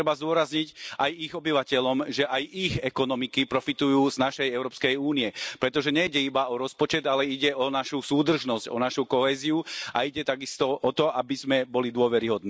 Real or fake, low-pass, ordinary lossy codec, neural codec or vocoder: real; none; none; none